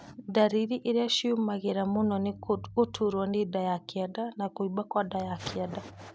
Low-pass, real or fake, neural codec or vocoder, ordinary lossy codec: none; real; none; none